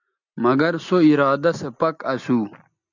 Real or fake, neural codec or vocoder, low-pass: real; none; 7.2 kHz